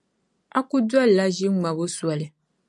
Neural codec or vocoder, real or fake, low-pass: none; real; 10.8 kHz